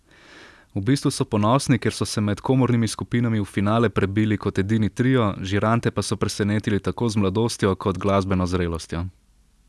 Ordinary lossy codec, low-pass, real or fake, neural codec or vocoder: none; none; real; none